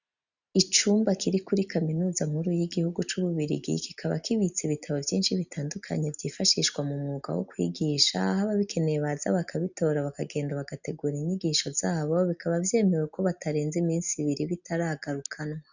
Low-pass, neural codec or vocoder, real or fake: 7.2 kHz; none; real